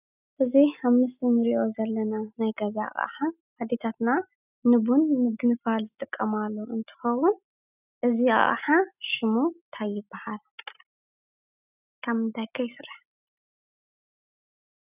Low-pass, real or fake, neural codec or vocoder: 3.6 kHz; real; none